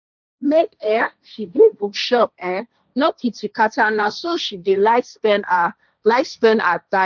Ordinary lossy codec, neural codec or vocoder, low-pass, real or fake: none; codec, 16 kHz, 1.1 kbps, Voila-Tokenizer; 7.2 kHz; fake